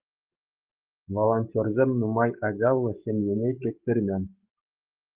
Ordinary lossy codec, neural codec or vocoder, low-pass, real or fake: Opus, 32 kbps; codec, 44.1 kHz, 7.8 kbps, DAC; 3.6 kHz; fake